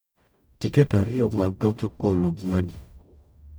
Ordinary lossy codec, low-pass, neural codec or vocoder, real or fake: none; none; codec, 44.1 kHz, 0.9 kbps, DAC; fake